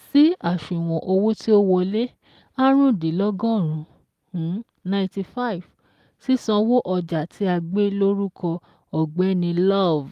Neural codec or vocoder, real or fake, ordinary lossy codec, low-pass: none; real; Opus, 32 kbps; 14.4 kHz